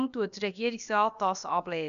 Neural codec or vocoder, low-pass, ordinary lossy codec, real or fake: codec, 16 kHz, about 1 kbps, DyCAST, with the encoder's durations; 7.2 kHz; none; fake